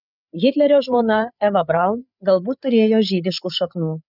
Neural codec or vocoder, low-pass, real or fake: codec, 16 kHz, 8 kbps, FreqCodec, larger model; 5.4 kHz; fake